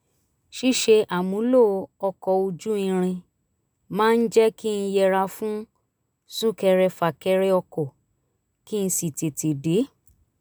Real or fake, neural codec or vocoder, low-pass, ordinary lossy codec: real; none; none; none